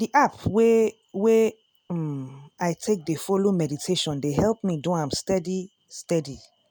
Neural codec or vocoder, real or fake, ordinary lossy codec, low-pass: none; real; none; none